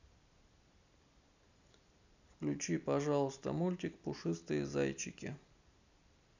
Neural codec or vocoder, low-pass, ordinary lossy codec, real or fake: none; 7.2 kHz; none; real